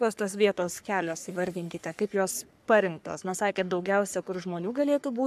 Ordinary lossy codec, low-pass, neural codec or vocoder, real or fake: AAC, 96 kbps; 14.4 kHz; codec, 44.1 kHz, 3.4 kbps, Pupu-Codec; fake